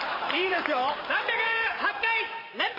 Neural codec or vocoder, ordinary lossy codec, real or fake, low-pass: vocoder, 44.1 kHz, 128 mel bands every 512 samples, BigVGAN v2; MP3, 24 kbps; fake; 5.4 kHz